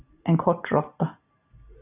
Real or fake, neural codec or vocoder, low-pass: real; none; 3.6 kHz